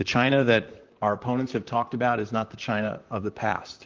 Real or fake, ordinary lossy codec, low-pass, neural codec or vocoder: fake; Opus, 24 kbps; 7.2 kHz; vocoder, 44.1 kHz, 128 mel bands, Pupu-Vocoder